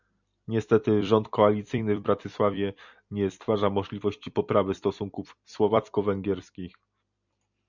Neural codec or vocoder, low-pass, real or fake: vocoder, 44.1 kHz, 128 mel bands every 256 samples, BigVGAN v2; 7.2 kHz; fake